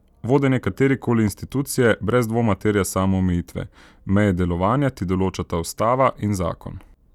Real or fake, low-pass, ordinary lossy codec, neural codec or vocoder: real; 19.8 kHz; none; none